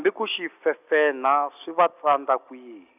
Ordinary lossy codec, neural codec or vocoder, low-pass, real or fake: none; none; 3.6 kHz; real